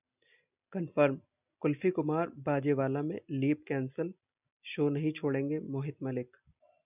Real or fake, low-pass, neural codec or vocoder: real; 3.6 kHz; none